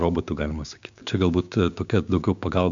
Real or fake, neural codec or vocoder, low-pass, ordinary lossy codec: fake; codec, 16 kHz, 6 kbps, DAC; 7.2 kHz; MP3, 64 kbps